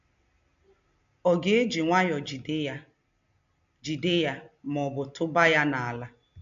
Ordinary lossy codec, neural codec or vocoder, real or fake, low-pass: AAC, 64 kbps; none; real; 7.2 kHz